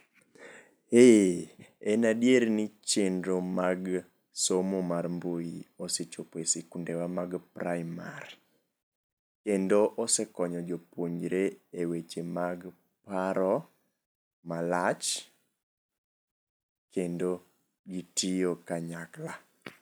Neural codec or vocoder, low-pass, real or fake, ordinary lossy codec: none; none; real; none